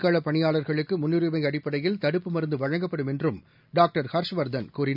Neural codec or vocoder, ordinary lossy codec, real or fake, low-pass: none; none; real; 5.4 kHz